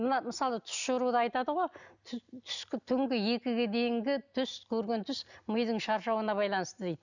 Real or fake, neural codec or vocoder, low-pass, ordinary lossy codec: real; none; 7.2 kHz; none